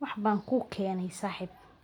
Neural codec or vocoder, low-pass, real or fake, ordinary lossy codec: none; 19.8 kHz; real; none